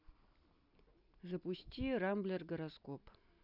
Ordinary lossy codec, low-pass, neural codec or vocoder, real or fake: none; 5.4 kHz; none; real